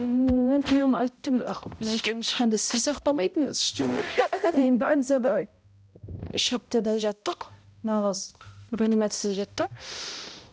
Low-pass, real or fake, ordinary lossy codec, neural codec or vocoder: none; fake; none; codec, 16 kHz, 0.5 kbps, X-Codec, HuBERT features, trained on balanced general audio